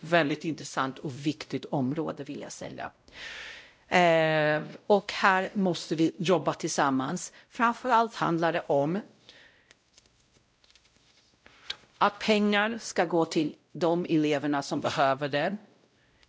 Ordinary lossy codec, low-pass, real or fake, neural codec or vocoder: none; none; fake; codec, 16 kHz, 0.5 kbps, X-Codec, WavLM features, trained on Multilingual LibriSpeech